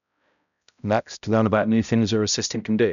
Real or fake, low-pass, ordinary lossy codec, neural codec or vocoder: fake; 7.2 kHz; none; codec, 16 kHz, 0.5 kbps, X-Codec, HuBERT features, trained on balanced general audio